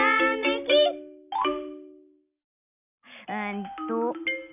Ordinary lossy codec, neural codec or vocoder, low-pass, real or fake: none; none; 3.6 kHz; real